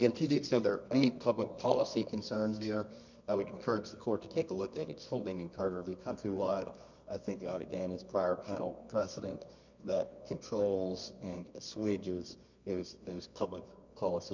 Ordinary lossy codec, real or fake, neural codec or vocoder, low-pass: MP3, 64 kbps; fake; codec, 24 kHz, 0.9 kbps, WavTokenizer, medium music audio release; 7.2 kHz